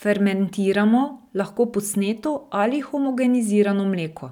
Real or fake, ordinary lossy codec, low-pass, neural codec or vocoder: real; none; 19.8 kHz; none